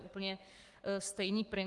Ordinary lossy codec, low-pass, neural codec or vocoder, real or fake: Opus, 24 kbps; 10.8 kHz; codec, 44.1 kHz, 7.8 kbps, Pupu-Codec; fake